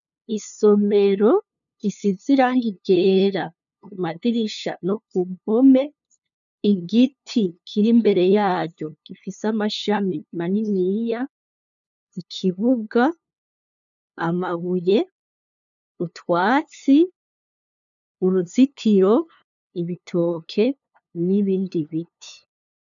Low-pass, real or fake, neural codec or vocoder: 7.2 kHz; fake; codec, 16 kHz, 2 kbps, FunCodec, trained on LibriTTS, 25 frames a second